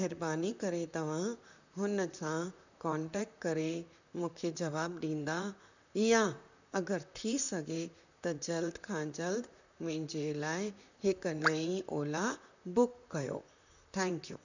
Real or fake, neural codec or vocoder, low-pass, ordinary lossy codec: fake; vocoder, 44.1 kHz, 128 mel bands, Pupu-Vocoder; 7.2 kHz; none